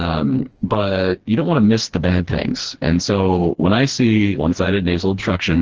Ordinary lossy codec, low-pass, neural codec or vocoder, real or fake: Opus, 16 kbps; 7.2 kHz; codec, 16 kHz, 2 kbps, FreqCodec, smaller model; fake